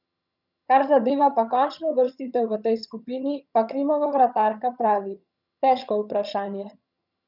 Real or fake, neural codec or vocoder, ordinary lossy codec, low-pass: fake; vocoder, 22.05 kHz, 80 mel bands, HiFi-GAN; none; 5.4 kHz